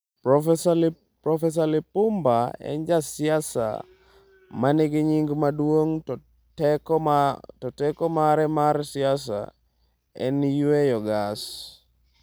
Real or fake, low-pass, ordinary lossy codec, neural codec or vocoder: real; none; none; none